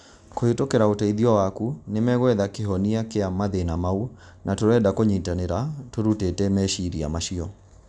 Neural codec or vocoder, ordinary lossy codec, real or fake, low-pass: none; none; real; 9.9 kHz